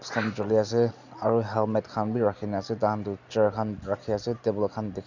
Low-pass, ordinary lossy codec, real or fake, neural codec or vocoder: 7.2 kHz; none; real; none